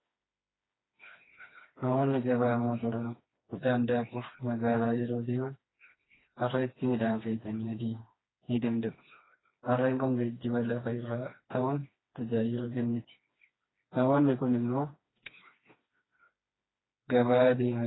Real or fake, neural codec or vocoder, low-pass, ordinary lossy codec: fake; codec, 16 kHz, 2 kbps, FreqCodec, smaller model; 7.2 kHz; AAC, 16 kbps